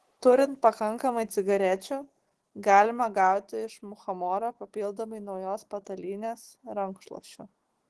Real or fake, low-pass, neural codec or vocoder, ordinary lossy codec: fake; 10.8 kHz; autoencoder, 48 kHz, 128 numbers a frame, DAC-VAE, trained on Japanese speech; Opus, 16 kbps